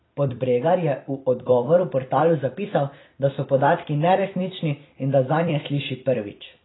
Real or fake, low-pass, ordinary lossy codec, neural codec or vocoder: fake; 7.2 kHz; AAC, 16 kbps; vocoder, 44.1 kHz, 128 mel bands every 256 samples, BigVGAN v2